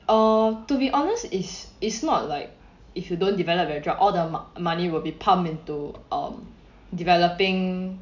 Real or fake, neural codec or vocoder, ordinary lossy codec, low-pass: real; none; none; 7.2 kHz